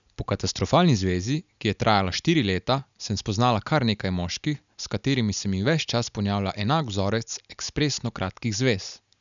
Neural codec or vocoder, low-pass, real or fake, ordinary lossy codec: none; 7.2 kHz; real; none